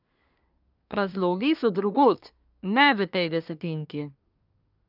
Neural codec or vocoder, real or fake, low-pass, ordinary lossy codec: codec, 24 kHz, 1 kbps, SNAC; fake; 5.4 kHz; none